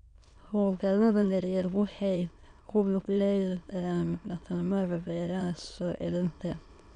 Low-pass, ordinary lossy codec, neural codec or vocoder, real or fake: 9.9 kHz; none; autoencoder, 22.05 kHz, a latent of 192 numbers a frame, VITS, trained on many speakers; fake